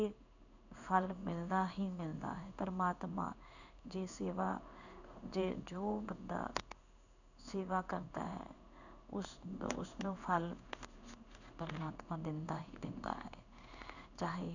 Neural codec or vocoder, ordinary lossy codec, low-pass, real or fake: codec, 16 kHz in and 24 kHz out, 1 kbps, XY-Tokenizer; none; 7.2 kHz; fake